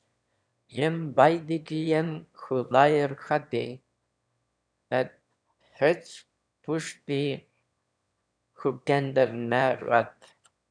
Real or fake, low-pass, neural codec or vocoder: fake; 9.9 kHz; autoencoder, 22.05 kHz, a latent of 192 numbers a frame, VITS, trained on one speaker